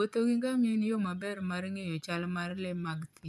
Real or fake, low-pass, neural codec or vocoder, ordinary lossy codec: fake; none; vocoder, 24 kHz, 100 mel bands, Vocos; none